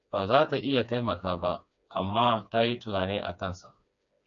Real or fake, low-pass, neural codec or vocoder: fake; 7.2 kHz; codec, 16 kHz, 2 kbps, FreqCodec, smaller model